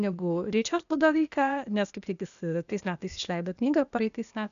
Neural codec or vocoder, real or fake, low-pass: codec, 16 kHz, 0.8 kbps, ZipCodec; fake; 7.2 kHz